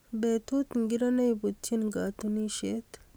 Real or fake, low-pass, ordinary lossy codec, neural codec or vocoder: real; none; none; none